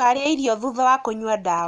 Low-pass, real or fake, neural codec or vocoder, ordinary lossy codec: 10.8 kHz; real; none; none